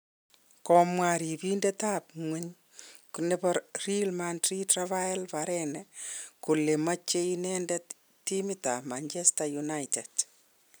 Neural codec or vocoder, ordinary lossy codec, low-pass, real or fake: none; none; none; real